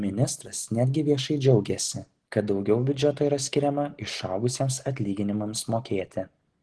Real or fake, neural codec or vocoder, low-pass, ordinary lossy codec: real; none; 10.8 kHz; Opus, 16 kbps